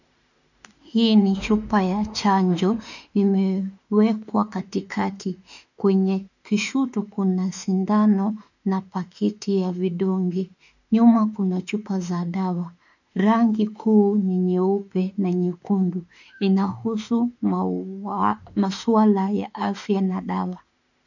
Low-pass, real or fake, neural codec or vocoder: 7.2 kHz; fake; autoencoder, 48 kHz, 32 numbers a frame, DAC-VAE, trained on Japanese speech